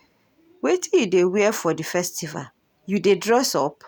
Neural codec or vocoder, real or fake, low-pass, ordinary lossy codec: none; real; none; none